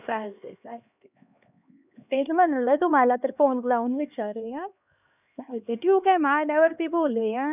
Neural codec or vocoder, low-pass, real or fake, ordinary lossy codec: codec, 16 kHz, 2 kbps, X-Codec, HuBERT features, trained on LibriSpeech; 3.6 kHz; fake; none